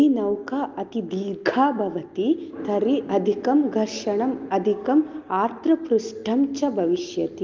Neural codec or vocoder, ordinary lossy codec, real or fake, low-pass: none; Opus, 32 kbps; real; 7.2 kHz